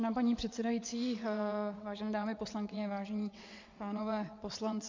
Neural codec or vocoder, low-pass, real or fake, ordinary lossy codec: vocoder, 22.05 kHz, 80 mel bands, WaveNeXt; 7.2 kHz; fake; MP3, 48 kbps